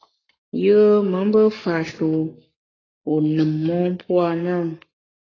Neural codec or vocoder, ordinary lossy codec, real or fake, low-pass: codec, 44.1 kHz, 7.8 kbps, Pupu-Codec; AAC, 32 kbps; fake; 7.2 kHz